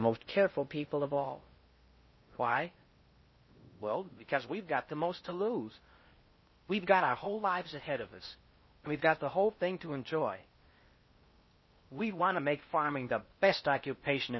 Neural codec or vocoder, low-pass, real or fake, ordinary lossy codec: codec, 16 kHz in and 24 kHz out, 0.6 kbps, FocalCodec, streaming, 4096 codes; 7.2 kHz; fake; MP3, 24 kbps